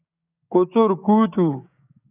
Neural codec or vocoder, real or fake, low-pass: codec, 16 kHz, 6 kbps, DAC; fake; 3.6 kHz